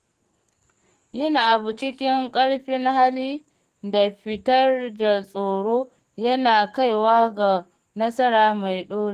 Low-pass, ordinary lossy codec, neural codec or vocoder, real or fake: 14.4 kHz; Opus, 24 kbps; codec, 44.1 kHz, 2.6 kbps, SNAC; fake